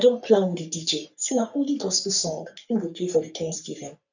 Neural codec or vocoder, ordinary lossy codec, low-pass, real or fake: codec, 44.1 kHz, 3.4 kbps, Pupu-Codec; none; 7.2 kHz; fake